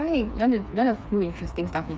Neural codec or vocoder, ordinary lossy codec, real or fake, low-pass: codec, 16 kHz, 4 kbps, FreqCodec, smaller model; none; fake; none